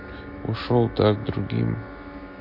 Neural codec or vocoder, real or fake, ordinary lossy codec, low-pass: none; real; MP3, 32 kbps; 5.4 kHz